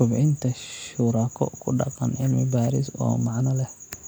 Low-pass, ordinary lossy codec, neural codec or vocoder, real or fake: none; none; none; real